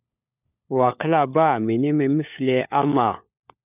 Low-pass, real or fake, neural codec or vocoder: 3.6 kHz; fake; codec, 16 kHz, 4 kbps, FunCodec, trained on LibriTTS, 50 frames a second